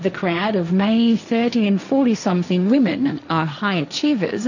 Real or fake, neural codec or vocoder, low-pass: fake; codec, 16 kHz, 1.1 kbps, Voila-Tokenizer; 7.2 kHz